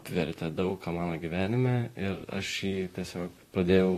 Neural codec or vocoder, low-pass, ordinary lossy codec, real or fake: vocoder, 44.1 kHz, 128 mel bands, Pupu-Vocoder; 14.4 kHz; AAC, 48 kbps; fake